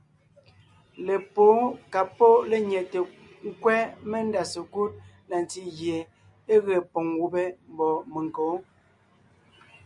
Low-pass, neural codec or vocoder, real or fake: 10.8 kHz; none; real